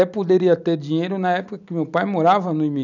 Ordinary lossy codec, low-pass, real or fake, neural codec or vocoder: none; 7.2 kHz; real; none